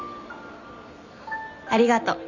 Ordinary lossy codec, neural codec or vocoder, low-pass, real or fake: none; none; 7.2 kHz; real